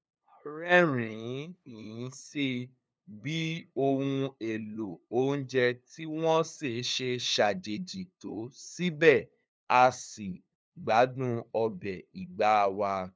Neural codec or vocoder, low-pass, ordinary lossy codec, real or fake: codec, 16 kHz, 2 kbps, FunCodec, trained on LibriTTS, 25 frames a second; none; none; fake